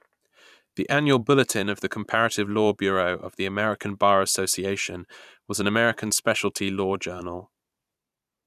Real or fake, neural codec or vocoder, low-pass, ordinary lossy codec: fake; vocoder, 44.1 kHz, 128 mel bands every 512 samples, BigVGAN v2; 14.4 kHz; none